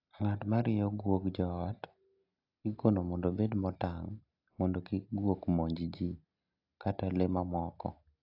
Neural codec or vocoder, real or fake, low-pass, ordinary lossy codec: none; real; 5.4 kHz; AAC, 48 kbps